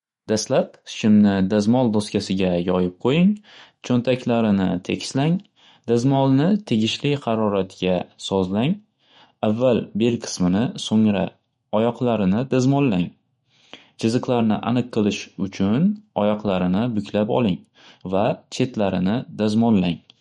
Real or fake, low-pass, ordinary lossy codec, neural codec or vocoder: fake; 19.8 kHz; MP3, 48 kbps; autoencoder, 48 kHz, 128 numbers a frame, DAC-VAE, trained on Japanese speech